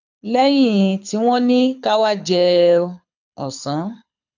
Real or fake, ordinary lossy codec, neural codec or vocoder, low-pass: fake; none; codec, 24 kHz, 6 kbps, HILCodec; 7.2 kHz